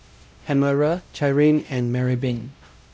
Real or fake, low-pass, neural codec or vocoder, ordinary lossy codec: fake; none; codec, 16 kHz, 0.5 kbps, X-Codec, WavLM features, trained on Multilingual LibriSpeech; none